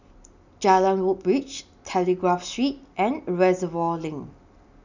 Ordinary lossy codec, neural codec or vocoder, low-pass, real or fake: none; none; 7.2 kHz; real